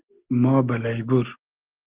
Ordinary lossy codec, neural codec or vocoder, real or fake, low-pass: Opus, 16 kbps; none; real; 3.6 kHz